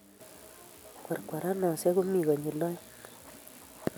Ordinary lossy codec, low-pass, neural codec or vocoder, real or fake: none; none; none; real